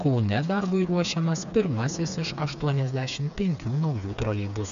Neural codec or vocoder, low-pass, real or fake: codec, 16 kHz, 4 kbps, FreqCodec, smaller model; 7.2 kHz; fake